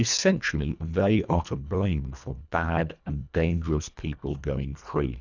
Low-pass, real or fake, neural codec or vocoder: 7.2 kHz; fake; codec, 24 kHz, 1.5 kbps, HILCodec